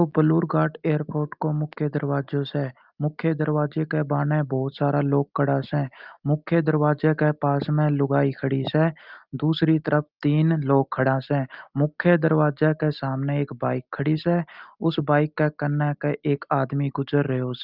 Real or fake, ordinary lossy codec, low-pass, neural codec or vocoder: real; Opus, 24 kbps; 5.4 kHz; none